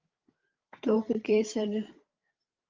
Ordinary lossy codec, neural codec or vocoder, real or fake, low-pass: Opus, 32 kbps; codec, 16 kHz, 8 kbps, FreqCodec, larger model; fake; 7.2 kHz